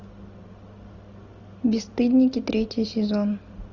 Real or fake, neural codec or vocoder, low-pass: real; none; 7.2 kHz